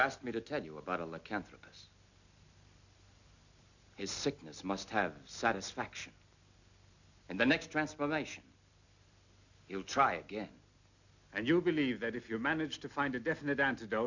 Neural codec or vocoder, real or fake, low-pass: none; real; 7.2 kHz